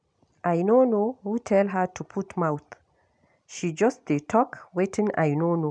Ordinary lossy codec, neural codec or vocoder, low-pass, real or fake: none; none; 9.9 kHz; real